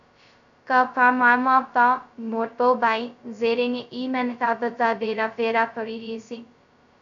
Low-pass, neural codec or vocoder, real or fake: 7.2 kHz; codec, 16 kHz, 0.2 kbps, FocalCodec; fake